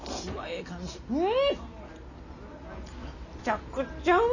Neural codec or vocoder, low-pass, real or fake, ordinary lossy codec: none; 7.2 kHz; real; none